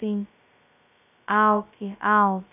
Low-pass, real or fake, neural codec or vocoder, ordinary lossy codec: 3.6 kHz; fake; codec, 16 kHz, 0.2 kbps, FocalCodec; none